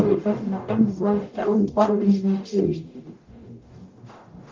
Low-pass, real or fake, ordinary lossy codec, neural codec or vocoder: 7.2 kHz; fake; Opus, 24 kbps; codec, 44.1 kHz, 0.9 kbps, DAC